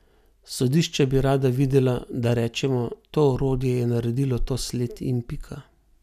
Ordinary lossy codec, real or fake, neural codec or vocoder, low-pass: none; real; none; 14.4 kHz